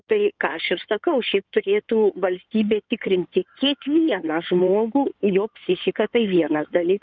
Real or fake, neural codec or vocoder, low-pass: fake; codec, 16 kHz in and 24 kHz out, 2.2 kbps, FireRedTTS-2 codec; 7.2 kHz